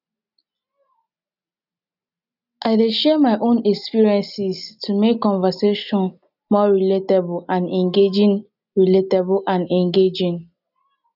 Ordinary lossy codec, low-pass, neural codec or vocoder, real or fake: none; 5.4 kHz; none; real